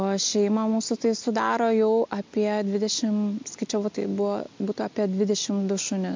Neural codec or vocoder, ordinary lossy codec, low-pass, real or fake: none; MP3, 48 kbps; 7.2 kHz; real